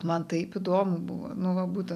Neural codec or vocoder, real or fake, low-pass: vocoder, 44.1 kHz, 128 mel bands every 256 samples, BigVGAN v2; fake; 14.4 kHz